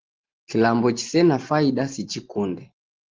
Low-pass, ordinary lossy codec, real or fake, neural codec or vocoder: 7.2 kHz; Opus, 16 kbps; real; none